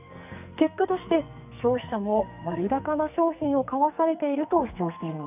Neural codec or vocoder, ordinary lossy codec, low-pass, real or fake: codec, 44.1 kHz, 2.6 kbps, SNAC; none; 3.6 kHz; fake